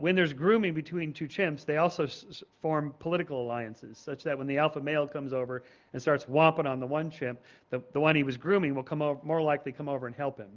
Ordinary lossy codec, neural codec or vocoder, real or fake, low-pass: Opus, 24 kbps; none; real; 7.2 kHz